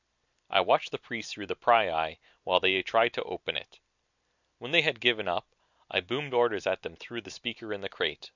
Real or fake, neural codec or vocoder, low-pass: real; none; 7.2 kHz